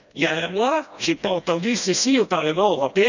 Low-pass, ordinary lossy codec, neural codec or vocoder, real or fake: 7.2 kHz; none; codec, 16 kHz, 1 kbps, FreqCodec, smaller model; fake